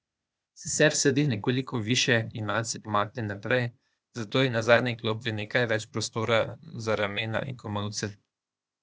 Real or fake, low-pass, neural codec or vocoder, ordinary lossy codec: fake; none; codec, 16 kHz, 0.8 kbps, ZipCodec; none